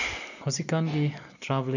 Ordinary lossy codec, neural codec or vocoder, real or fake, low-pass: none; none; real; 7.2 kHz